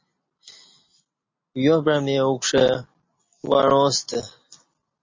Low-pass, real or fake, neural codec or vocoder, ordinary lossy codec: 7.2 kHz; real; none; MP3, 32 kbps